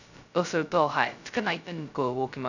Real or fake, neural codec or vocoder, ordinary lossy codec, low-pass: fake; codec, 16 kHz, 0.2 kbps, FocalCodec; none; 7.2 kHz